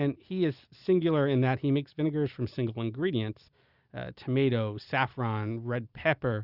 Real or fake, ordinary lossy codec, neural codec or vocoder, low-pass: real; Opus, 64 kbps; none; 5.4 kHz